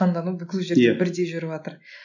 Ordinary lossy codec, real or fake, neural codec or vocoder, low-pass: none; real; none; 7.2 kHz